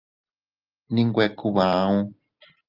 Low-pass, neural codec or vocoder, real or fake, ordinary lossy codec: 5.4 kHz; none; real; Opus, 32 kbps